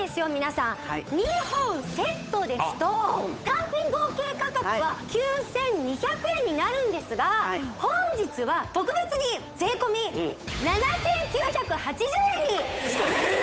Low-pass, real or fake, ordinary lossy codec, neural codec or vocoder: none; fake; none; codec, 16 kHz, 8 kbps, FunCodec, trained on Chinese and English, 25 frames a second